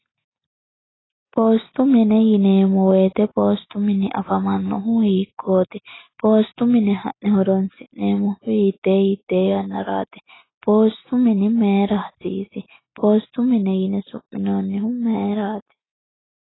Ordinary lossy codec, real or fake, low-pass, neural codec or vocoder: AAC, 16 kbps; real; 7.2 kHz; none